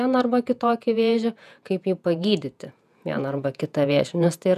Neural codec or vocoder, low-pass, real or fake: vocoder, 48 kHz, 128 mel bands, Vocos; 14.4 kHz; fake